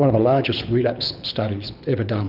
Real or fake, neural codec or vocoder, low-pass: fake; codec, 24 kHz, 6 kbps, HILCodec; 5.4 kHz